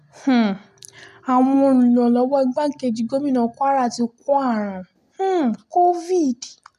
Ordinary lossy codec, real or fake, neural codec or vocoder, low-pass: none; real; none; 14.4 kHz